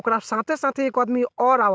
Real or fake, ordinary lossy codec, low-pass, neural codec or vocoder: real; none; none; none